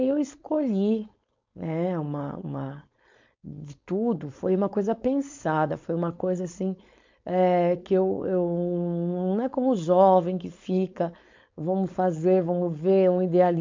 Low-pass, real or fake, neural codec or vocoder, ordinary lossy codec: 7.2 kHz; fake; codec, 16 kHz, 4.8 kbps, FACodec; MP3, 64 kbps